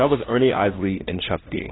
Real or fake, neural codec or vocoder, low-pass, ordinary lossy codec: fake; codec, 16 kHz, 1 kbps, FunCodec, trained on LibriTTS, 50 frames a second; 7.2 kHz; AAC, 16 kbps